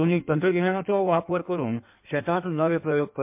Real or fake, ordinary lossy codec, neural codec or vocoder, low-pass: fake; MP3, 32 kbps; codec, 16 kHz in and 24 kHz out, 1.1 kbps, FireRedTTS-2 codec; 3.6 kHz